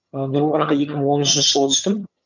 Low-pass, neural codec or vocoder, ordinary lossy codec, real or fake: 7.2 kHz; vocoder, 22.05 kHz, 80 mel bands, HiFi-GAN; none; fake